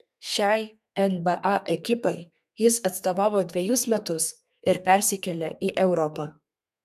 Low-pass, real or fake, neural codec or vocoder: 14.4 kHz; fake; codec, 32 kHz, 1.9 kbps, SNAC